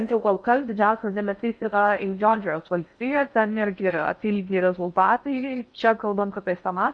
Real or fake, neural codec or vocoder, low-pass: fake; codec, 16 kHz in and 24 kHz out, 0.6 kbps, FocalCodec, streaming, 4096 codes; 9.9 kHz